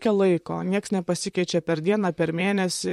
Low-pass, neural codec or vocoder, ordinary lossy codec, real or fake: 19.8 kHz; vocoder, 44.1 kHz, 128 mel bands, Pupu-Vocoder; MP3, 64 kbps; fake